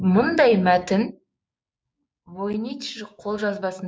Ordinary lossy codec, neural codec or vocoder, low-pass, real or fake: none; codec, 16 kHz, 6 kbps, DAC; none; fake